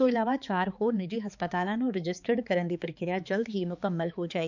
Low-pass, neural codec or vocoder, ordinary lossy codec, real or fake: 7.2 kHz; codec, 16 kHz, 4 kbps, X-Codec, HuBERT features, trained on balanced general audio; none; fake